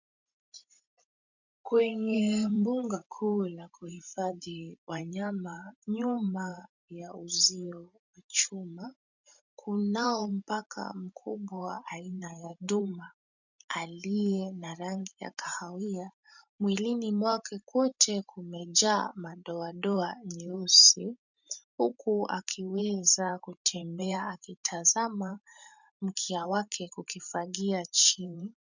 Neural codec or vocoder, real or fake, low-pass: vocoder, 44.1 kHz, 128 mel bands every 512 samples, BigVGAN v2; fake; 7.2 kHz